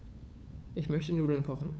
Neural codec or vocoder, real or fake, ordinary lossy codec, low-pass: codec, 16 kHz, 8 kbps, FunCodec, trained on LibriTTS, 25 frames a second; fake; none; none